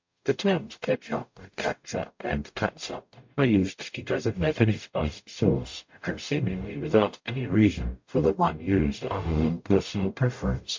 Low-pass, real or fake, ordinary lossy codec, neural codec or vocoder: 7.2 kHz; fake; MP3, 48 kbps; codec, 44.1 kHz, 0.9 kbps, DAC